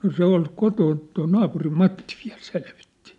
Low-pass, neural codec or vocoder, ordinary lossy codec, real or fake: 10.8 kHz; none; none; real